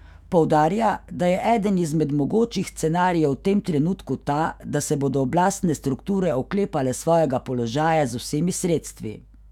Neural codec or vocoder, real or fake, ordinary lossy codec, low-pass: autoencoder, 48 kHz, 128 numbers a frame, DAC-VAE, trained on Japanese speech; fake; none; 19.8 kHz